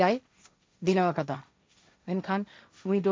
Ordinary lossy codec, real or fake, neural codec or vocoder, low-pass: none; fake; codec, 16 kHz, 1.1 kbps, Voila-Tokenizer; none